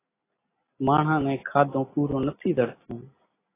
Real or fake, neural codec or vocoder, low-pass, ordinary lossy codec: real; none; 3.6 kHz; AAC, 16 kbps